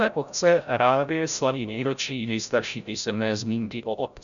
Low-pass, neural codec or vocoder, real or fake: 7.2 kHz; codec, 16 kHz, 0.5 kbps, FreqCodec, larger model; fake